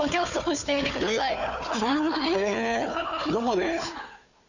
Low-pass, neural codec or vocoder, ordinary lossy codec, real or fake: 7.2 kHz; codec, 16 kHz, 4 kbps, FunCodec, trained on Chinese and English, 50 frames a second; none; fake